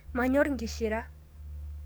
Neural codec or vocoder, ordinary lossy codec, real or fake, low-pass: codec, 44.1 kHz, 7.8 kbps, DAC; none; fake; none